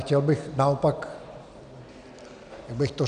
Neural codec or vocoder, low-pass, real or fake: none; 9.9 kHz; real